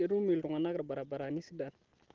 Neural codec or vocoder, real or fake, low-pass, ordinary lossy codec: none; real; 7.2 kHz; Opus, 16 kbps